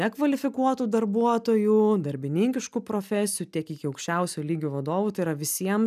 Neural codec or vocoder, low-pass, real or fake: none; 14.4 kHz; real